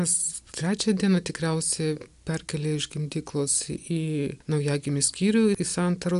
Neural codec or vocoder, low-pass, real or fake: none; 10.8 kHz; real